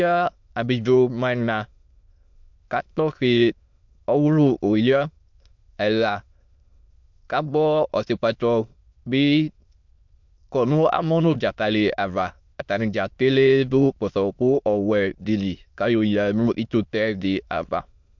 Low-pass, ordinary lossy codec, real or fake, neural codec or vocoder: 7.2 kHz; MP3, 64 kbps; fake; autoencoder, 22.05 kHz, a latent of 192 numbers a frame, VITS, trained on many speakers